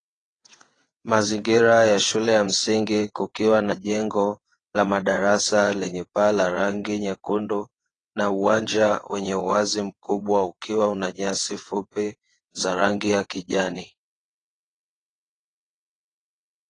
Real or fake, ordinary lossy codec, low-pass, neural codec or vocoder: fake; AAC, 32 kbps; 9.9 kHz; vocoder, 22.05 kHz, 80 mel bands, WaveNeXt